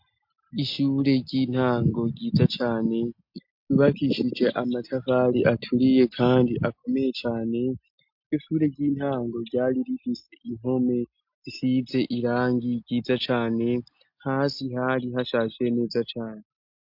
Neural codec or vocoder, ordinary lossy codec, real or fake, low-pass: none; MP3, 48 kbps; real; 5.4 kHz